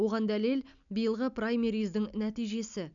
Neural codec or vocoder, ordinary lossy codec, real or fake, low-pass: none; none; real; 7.2 kHz